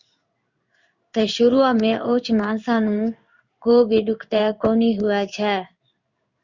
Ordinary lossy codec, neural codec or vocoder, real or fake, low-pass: Opus, 64 kbps; codec, 16 kHz in and 24 kHz out, 1 kbps, XY-Tokenizer; fake; 7.2 kHz